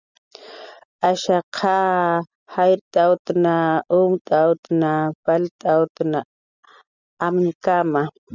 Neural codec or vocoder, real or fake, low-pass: none; real; 7.2 kHz